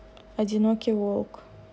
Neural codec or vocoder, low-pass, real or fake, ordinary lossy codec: none; none; real; none